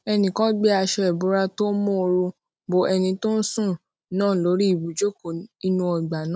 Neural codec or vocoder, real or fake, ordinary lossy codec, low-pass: none; real; none; none